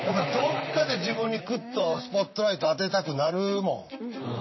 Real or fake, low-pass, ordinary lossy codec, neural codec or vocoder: fake; 7.2 kHz; MP3, 24 kbps; vocoder, 44.1 kHz, 128 mel bands, Pupu-Vocoder